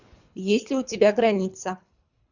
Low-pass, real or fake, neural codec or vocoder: 7.2 kHz; fake; codec, 24 kHz, 3 kbps, HILCodec